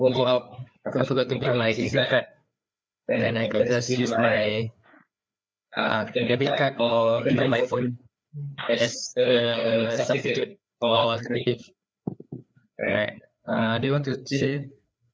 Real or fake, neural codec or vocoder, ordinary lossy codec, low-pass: fake; codec, 16 kHz, 4 kbps, FreqCodec, larger model; none; none